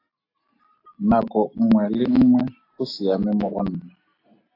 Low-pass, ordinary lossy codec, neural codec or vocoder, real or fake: 5.4 kHz; AAC, 32 kbps; none; real